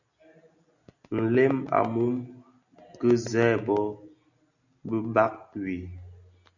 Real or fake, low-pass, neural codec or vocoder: real; 7.2 kHz; none